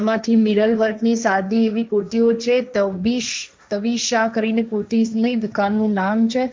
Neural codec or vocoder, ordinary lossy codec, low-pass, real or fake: codec, 16 kHz, 1.1 kbps, Voila-Tokenizer; none; none; fake